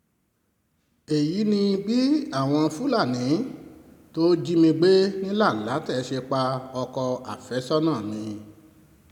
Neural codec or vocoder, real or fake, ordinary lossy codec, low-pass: none; real; none; 19.8 kHz